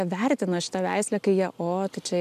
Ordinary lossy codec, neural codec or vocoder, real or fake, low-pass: MP3, 96 kbps; none; real; 14.4 kHz